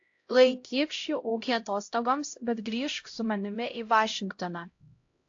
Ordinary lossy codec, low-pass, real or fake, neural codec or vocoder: AAC, 48 kbps; 7.2 kHz; fake; codec, 16 kHz, 0.5 kbps, X-Codec, HuBERT features, trained on LibriSpeech